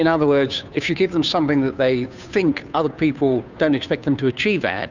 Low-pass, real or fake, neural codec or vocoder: 7.2 kHz; fake; codec, 16 kHz, 2 kbps, FunCodec, trained on Chinese and English, 25 frames a second